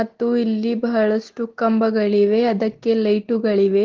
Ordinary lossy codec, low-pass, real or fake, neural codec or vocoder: Opus, 16 kbps; 7.2 kHz; real; none